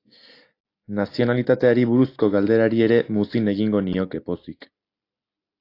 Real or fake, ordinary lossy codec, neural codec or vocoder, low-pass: real; AAC, 32 kbps; none; 5.4 kHz